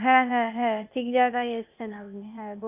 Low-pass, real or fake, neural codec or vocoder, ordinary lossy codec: 3.6 kHz; fake; codec, 16 kHz, 0.8 kbps, ZipCodec; none